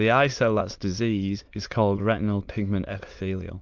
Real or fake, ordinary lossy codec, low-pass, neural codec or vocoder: fake; Opus, 24 kbps; 7.2 kHz; autoencoder, 22.05 kHz, a latent of 192 numbers a frame, VITS, trained on many speakers